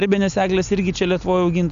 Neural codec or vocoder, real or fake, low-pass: none; real; 7.2 kHz